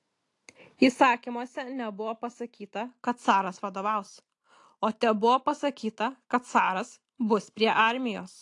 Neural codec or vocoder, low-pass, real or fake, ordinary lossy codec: none; 10.8 kHz; real; AAC, 48 kbps